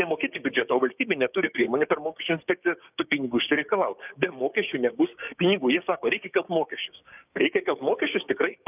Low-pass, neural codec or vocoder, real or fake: 3.6 kHz; codec, 16 kHz, 6 kbps, DAC; fake